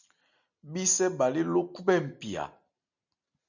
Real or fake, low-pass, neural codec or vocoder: real; 7.2 kHz; none